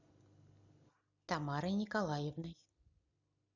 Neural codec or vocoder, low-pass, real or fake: none; 7.2 kHz; real